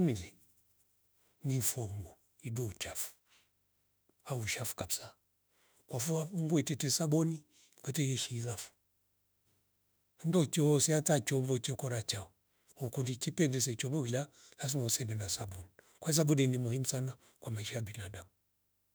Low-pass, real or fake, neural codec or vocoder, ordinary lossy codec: none; fake; autoencoder, 48 kHz, 32 numbers a frame, DAC-VAE, trained on Japanese speech; none